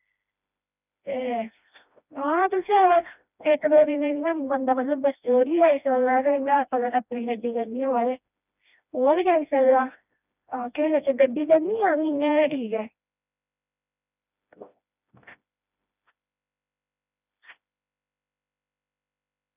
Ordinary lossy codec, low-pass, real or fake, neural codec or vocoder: none; 3.6 kHz; fake; codec, 16 kHz, 1 kbps, FreqCodec, smaller model